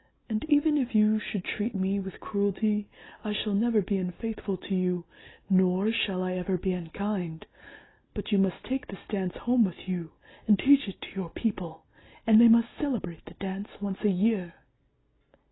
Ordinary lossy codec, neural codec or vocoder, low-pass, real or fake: AAC, 16 kbps; none; 7.2 kHz; real